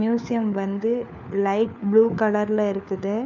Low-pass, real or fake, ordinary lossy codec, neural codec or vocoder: 7.2 kHz; fake; none; codec, 16 kHz, 4 kbps, FunCodec, trained on LibriTTS, 50 frames a second